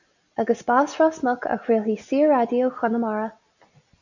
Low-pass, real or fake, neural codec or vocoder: 7.2 kHz; real; none